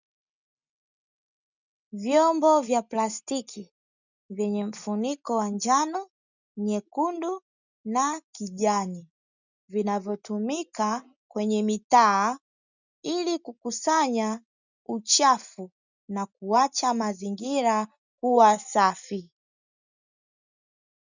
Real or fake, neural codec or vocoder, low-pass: real; none; 7.2 kHz